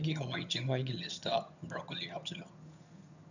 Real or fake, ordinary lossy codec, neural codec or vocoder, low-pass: fake; none; vocoder, 22.05 kHz, 80 mel bands, HiFi-GAN; 7.2 kHz